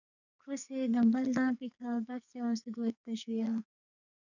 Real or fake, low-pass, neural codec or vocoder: fake; 7.2 kHz; codec, 44.1 kHz, 2.6 kbps, SNAC